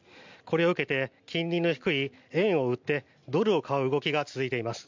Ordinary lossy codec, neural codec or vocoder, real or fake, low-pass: MP3, 64 kbps; none; real; 7.2 kHz